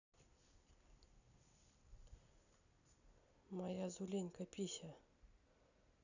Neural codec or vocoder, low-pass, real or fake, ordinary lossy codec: none; 7.2 kHz; real; none